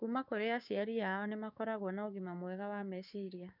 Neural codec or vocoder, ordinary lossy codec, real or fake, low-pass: codec, 16 kHz, 6 kbps, DAC; none; fake; 5.4 kHz